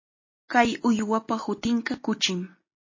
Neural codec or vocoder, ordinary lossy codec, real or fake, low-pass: none; MP3, 32 kbps; real; 7.2 kHz